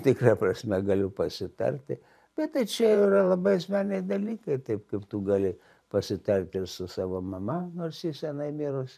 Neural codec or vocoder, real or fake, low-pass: vocoder, 44.1 kHz, 128 mel bands every 512 samples, BigVGAN v2; fake; 14.4 kHz